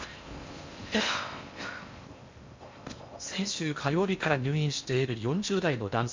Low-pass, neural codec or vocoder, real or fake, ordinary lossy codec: 7.2 kHz; codec, 16 kHz in and 24 kHz out, 0.6 kbps, FocalCodec, streaming, 4096 codes; fake; MP3, 64 kbps